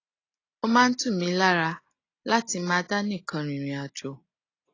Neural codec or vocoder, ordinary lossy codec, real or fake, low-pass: none; AAC, 32 kbps; real; 7.2 kHz